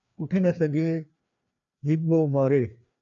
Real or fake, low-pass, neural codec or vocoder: fake; 7.2 kHz; codec, 16 kHz, 2 kbps, FreqCodec, larger model